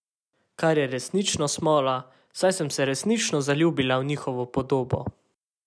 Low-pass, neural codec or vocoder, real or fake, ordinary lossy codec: none; none; real; none